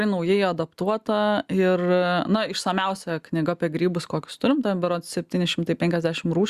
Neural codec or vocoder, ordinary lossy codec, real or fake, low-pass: none; Opus, 64 kbps; real; 14.4 kHz